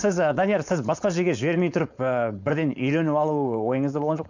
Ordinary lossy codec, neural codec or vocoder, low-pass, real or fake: none; codec, 16 kHz, 4.8 kbps, FACodec; 7.2 kHz; fake